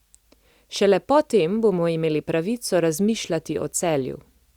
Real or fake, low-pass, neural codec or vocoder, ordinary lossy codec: real; 19.8 kHz; none; Opus, 64 kbps